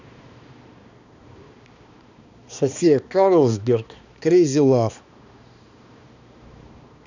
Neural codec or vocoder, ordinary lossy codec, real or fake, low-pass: codec, 16 kHz, 2 kbps, X-Codec, HuBERT features, trained on balanced general audio; none; fake; 7.2 kHz